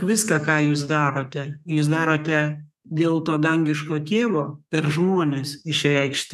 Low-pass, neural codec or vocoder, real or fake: 14.4 kHz; codec, 32 kHz, 1.9 kbps, SNAC; fake